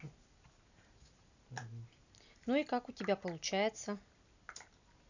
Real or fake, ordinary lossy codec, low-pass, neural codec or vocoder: real; none; 7.2 kHz; none